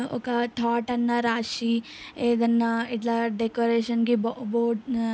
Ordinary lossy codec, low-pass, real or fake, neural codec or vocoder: none; none; real; none